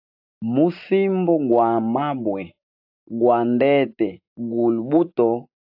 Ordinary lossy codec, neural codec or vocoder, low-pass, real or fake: AAC, 48 kbps; none; 5.4 kHz; real